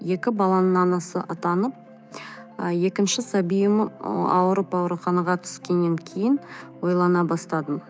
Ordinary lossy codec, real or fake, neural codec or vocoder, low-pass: none; fake; codec, 16 kHz, 6 kbps, DAC; none